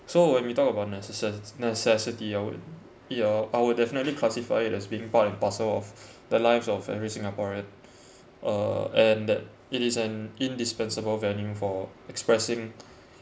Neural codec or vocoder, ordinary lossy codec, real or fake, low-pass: none; none; real; none